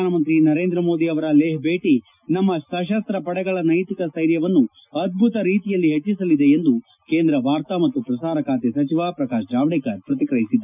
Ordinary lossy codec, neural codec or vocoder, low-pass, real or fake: none; none; 3.6 kHz; real